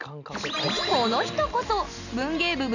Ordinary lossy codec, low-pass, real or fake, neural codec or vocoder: none; 7.2 kHz; real; none